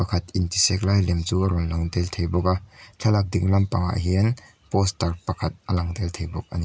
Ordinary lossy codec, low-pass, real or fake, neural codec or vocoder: none; none; real; none